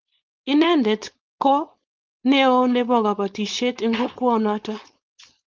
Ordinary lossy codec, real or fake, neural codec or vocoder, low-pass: Opus, 32 kbps; fake; codec, 16 kHz, 4.8 kbps, FACodec; 7.2 kHz